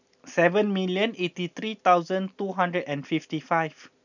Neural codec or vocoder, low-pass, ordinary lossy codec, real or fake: none; 7.2 kHz; none; real